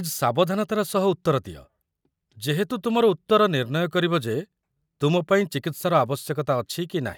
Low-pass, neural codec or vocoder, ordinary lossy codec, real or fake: none; none; none; real